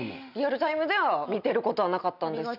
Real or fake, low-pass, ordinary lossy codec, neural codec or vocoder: real; 5.4 kHz; none; none